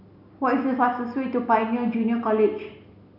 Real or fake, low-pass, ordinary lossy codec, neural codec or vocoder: real; 5.4 kHz; none; none